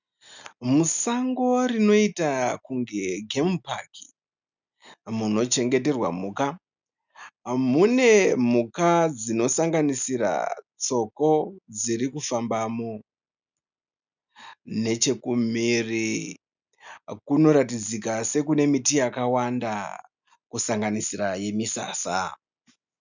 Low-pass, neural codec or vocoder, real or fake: 7.2 kHz; none; real